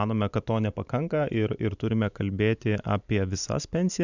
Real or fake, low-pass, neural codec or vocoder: fake; 7.2 kHz; vocoder, 44.1 kHz, 128 mel bands every 512 samples, BigVGAN v2